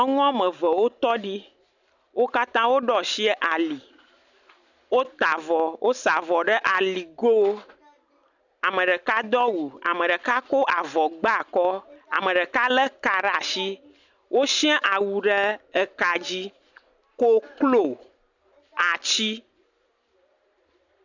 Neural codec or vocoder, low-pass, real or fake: none; 7.2 kHz; real